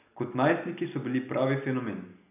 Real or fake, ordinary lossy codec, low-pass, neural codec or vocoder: real; none; 3.6 kHz; none